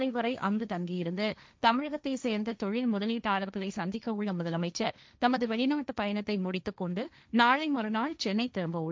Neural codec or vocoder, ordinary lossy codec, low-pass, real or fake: codec, 16 kHz, 1.1 kbps, Voila-Tokenizer; none; none; fake